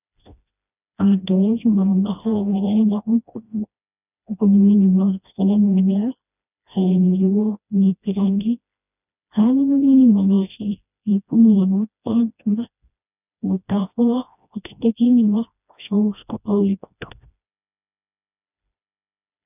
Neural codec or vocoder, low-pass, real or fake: codec, 16 kHz, 1 kbps, FreqCodec, smaller model; 3.6 kHz; fake